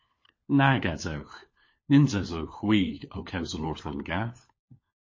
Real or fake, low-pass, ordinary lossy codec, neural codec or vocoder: fake; 7.2 kHz; MP3, 32 kbps; codec, 16 kHz, 4 kbps, FunCodec, trained on LibriTTS, 50 frames a second